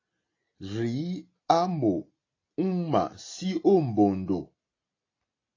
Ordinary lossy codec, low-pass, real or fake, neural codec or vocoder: AAC, 32 kbps; 7.2 kHz; real; none